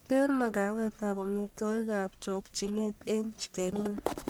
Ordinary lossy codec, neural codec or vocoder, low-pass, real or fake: none; codec, 44.1 kHz, 1.7 kbps, Pupu-Codec; none; fake